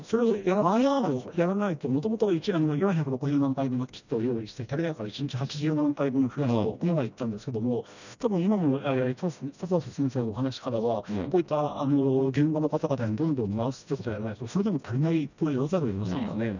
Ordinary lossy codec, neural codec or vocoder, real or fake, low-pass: none; codec, 16 kHz, 1 kbps, FreqCodec, smaller model; fake; 7.2 kHz